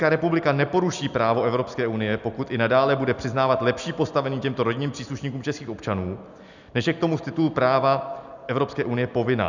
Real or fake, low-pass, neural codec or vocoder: real; 7.2 kHz; none